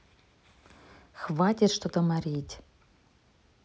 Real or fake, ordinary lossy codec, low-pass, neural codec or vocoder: real; none; none; none